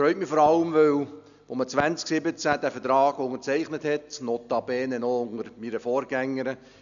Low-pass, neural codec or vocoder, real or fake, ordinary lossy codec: 7.2 kHz; none; real; none